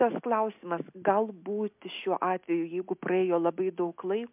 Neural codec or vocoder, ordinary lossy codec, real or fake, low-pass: none; MP3, 32 kbps; real; 3.6 kHz